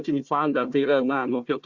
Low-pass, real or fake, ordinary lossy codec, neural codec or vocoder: 7.2 kHz; fake; Opus, 64 kbps; codec, 16 kHz, 1 kbps, FunCodec, trained on Chinese and English, 50 frames a second